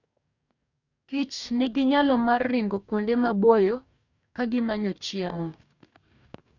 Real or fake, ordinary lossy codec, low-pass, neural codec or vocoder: fake; none; 7.2 kHz; codec, 44.1 kHz, 2.6 kbps, DAC